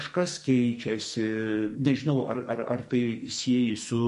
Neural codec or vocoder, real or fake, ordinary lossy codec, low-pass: codec, 44.1 kHz, 2.6 kbps, SNAC; fake; MP3, 48 kbps; 14.4 kHz